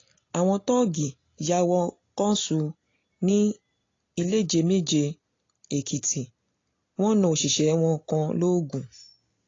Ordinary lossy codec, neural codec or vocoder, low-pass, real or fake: AAC, 32 kbps; none; 7.2 kHz; real